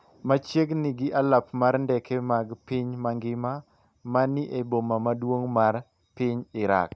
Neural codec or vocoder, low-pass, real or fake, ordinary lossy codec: none; none; real; none